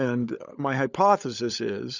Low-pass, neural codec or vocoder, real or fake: 7.2 kHz; codec, 16 kHz, 16 kbps, FunCodec, trained on LibriTTS, 50 frames a second; fake